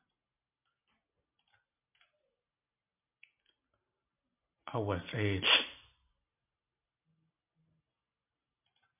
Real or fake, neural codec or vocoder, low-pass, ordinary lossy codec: real; none; 3.6 kHz; MP3, 32 kbps